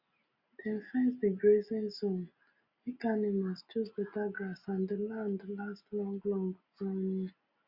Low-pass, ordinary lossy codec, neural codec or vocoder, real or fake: 5.4 kHz; Opus, 64 kbps; none; real